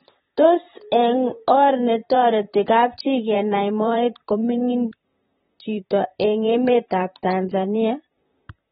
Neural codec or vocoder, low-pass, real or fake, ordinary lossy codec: vocoder, 44.1 kHz, 128 mel bands, Pupu-Vocoder; 19.8 kHz; fake; AAC, 16 kbps